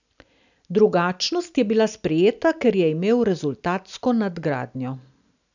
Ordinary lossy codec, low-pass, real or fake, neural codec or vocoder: none; 7.2 kHz; real; none